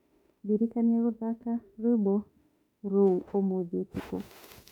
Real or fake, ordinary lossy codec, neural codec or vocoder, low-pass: fake; none; autoencoder, 48 kHz, 32 numbers a frame, DAC-VAE, trained on Japanese speech; 19.8 kHz